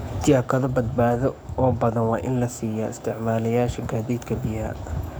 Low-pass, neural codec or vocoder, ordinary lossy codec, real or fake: none; codec, 44.1 kHz, 7.8 kbps, DAC; none; fake